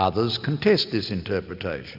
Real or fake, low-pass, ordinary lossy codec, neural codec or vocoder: real; 5.4 kHz; AAC, 48 kbps; none